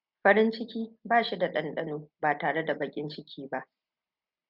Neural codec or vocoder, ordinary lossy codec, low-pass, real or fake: none; Opus, 64 kbps; 5.4 kHz; real